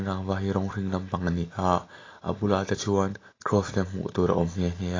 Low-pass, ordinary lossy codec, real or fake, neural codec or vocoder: 7.2 kHz; AAC, 32 kbps; real; none